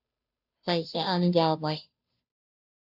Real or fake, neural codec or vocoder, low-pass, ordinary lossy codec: fake; codec, 16 kHz, 0.5 kbps, FunCodec, trained on Chinese and English, 25 frames a second; 5.4 kHz; AAC, 48 kbps